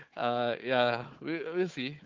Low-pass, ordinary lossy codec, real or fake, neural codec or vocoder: 7.2 kHz; Opus, 32 kbps; fake; codec, 16 kHz, 4 kbps, FunCodec, trained on Chinese and English, 50 frames a second